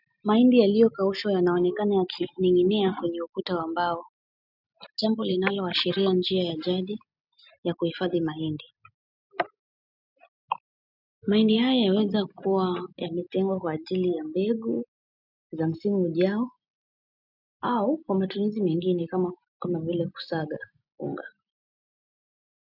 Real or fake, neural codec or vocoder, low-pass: real; none; 5.4 kHz